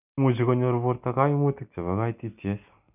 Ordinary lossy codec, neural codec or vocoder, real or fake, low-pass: none; none; real; 3.6 kHz